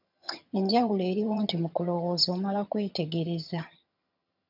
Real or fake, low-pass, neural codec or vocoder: fake; 5.4 kHz; vocoder, 22.05 kHz, 80 mel bands, HiFi-GAN